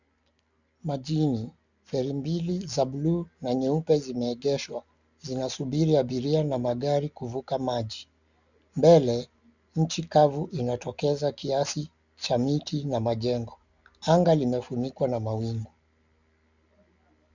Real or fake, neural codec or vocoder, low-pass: real; none; 7.2 kHz